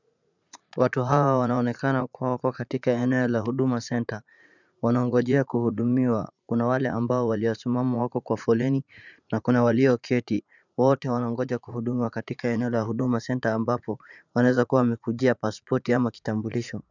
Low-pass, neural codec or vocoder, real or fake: 7.2 kHz; vocoder, 44.1 kHz, 80 mel bands, Vocos; fake